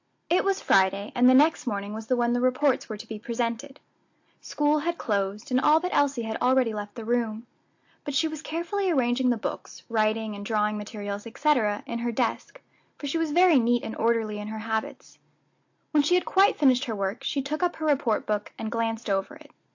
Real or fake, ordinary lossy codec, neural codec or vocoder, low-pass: real; AAC, 48 kbps; none; 7.2 kHz